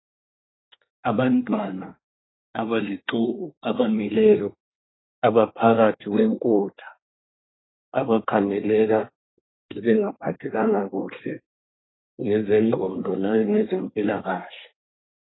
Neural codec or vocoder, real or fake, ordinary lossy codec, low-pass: codec, 24 kHz, 1 kbps, SNAC; fake; AAC, 16 kbps; 7.2 kHz